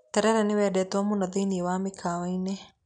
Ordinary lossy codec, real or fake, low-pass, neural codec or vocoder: none; real; 9.9 kHz; none